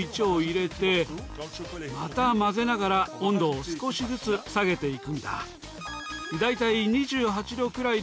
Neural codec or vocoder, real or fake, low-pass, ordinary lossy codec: none; real; none; none